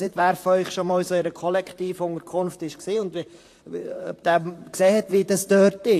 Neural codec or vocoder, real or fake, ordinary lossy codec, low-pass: vocoder, 44.1 kHz, 128 mel bands, Pupu-Vocoder; fake; AAC, 64 kbps; 14.4 kHz